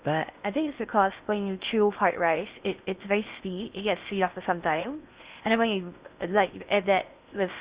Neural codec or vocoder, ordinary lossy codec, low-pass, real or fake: codec, 16 kHz in and 24 kHz out, 0.6 kbps, FocalCodec, streaming, 2048 codes; Opus, 64 kbps; 3.6 kHz; fake